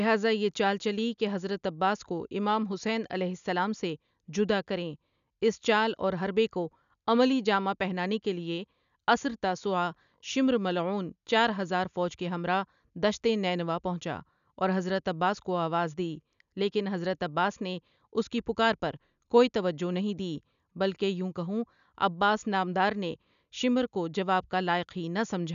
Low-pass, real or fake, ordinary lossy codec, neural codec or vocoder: 7.2 kHz; real; none; none